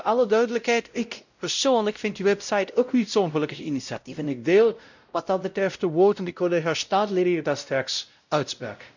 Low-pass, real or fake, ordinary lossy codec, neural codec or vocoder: 7.2 kHz; fake; none; codec, 16 kHz, 0.5 kbps, X-Codec, WavLM features, trained on Multilingual LibriSpeech